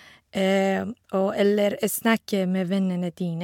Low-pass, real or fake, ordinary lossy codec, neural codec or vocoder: 19.8 kHz; real; MP3, 96 kbps; none